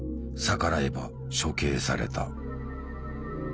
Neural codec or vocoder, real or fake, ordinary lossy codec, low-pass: none; real; none; none